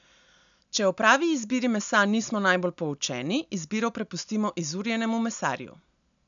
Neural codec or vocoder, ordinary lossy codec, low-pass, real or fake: none; none; 7.2 kHz; real